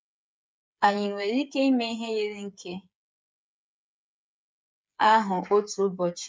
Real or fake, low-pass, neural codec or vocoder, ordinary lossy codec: fake; none; codec, 16 kHz, 8 kbps, FreqCodec, smaller model; none